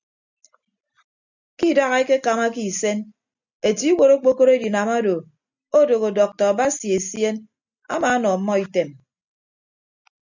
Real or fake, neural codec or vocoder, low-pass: real; none; 7.2 kHz